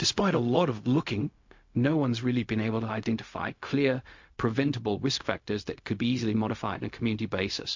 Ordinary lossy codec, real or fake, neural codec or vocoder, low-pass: MP3, 48 kbps; fake; codec, 16 kHz, 0.4 kbps, LongCat-Audio-Codec; 7.2 kHz